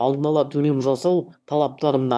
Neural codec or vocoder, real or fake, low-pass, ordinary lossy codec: autoencoder, 22.05 kHz, a latent of 192 numbers a frame, VITS, trained on one speaker; fake; none; none